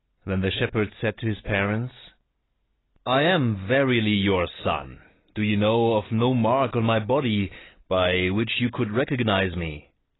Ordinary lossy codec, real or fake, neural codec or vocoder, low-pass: AAC, 16 kbps; real; none; 7.2 kHz